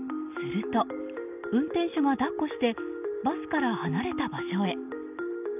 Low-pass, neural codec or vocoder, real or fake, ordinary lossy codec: 3.6 kHz; none; real; none